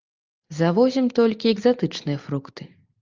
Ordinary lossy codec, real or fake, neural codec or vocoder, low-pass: Opus, 24 kbps; real; none; 7.2 kHz